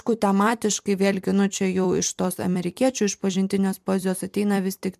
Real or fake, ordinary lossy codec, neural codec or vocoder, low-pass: fake; MP3, 96 kbps; vocoder, 44.1 kHz, 128 mel bands every 256 samples, BigVGAN v2; 14.4 kHz